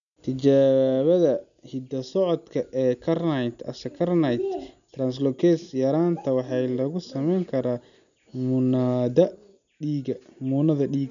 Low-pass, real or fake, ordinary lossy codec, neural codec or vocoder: 7.2 kHz; real; none; none